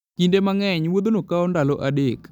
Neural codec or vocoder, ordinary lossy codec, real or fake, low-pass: none; none; real; 19.8 kHz